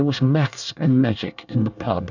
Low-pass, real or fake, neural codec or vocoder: 7.2 kHz; fake; codec, 24 kHz, 1 kbps, SNAC